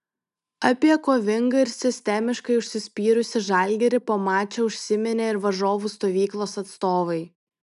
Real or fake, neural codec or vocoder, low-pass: real; none; 10.8 kHz